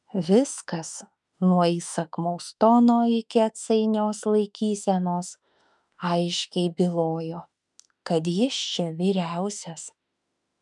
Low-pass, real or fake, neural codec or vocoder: 10.8 kHz; fake; autoencoder, 48 kHz, 32 numbers a frame, DAC-VAE, trained on Japanese speech